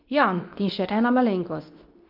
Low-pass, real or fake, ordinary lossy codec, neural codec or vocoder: 5.4 kHz; fake; Opus, 24 kbps; codec, 24 kHz, 0.9 kbps, WavTokenizer, medium speech release version 2